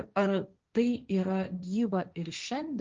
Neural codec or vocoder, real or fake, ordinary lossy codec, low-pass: codec, 16 kHz, 1.1 kbps, Voila-Tokenizer; fake; Opus, 24 kbps; 7.2 kHz